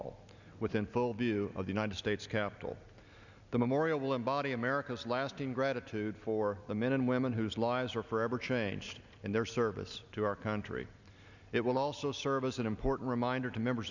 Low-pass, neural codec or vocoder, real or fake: 7.2 kHz; none; real